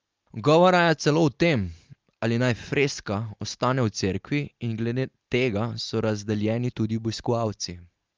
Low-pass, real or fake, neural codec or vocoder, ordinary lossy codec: 7.2 kHz; real; none; Opus, 24 kbps